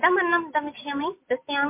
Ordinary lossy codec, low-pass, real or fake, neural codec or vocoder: MP3, 24 kbps; 3.6 kHz; real; none